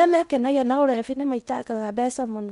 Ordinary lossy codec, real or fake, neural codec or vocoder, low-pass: none; fake; codec, 16 kHz in and 24 kHz out, 0.8 kbps, FocalCodec, streaming, 65536 codes; 10.8 kHz